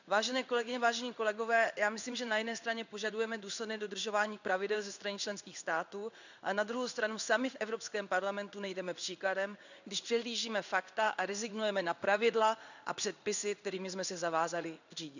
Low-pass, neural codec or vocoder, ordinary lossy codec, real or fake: 7.2 kHz; codec, 16 kHz in and 24 kHz out, 1 kbps, XY-Tokenizer; none; fake